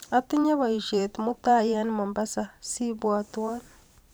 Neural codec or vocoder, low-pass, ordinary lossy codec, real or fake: vocoder, 44.1 kHz, 128 mel bands every 512 samples, BigVGAN v2; none; none; fake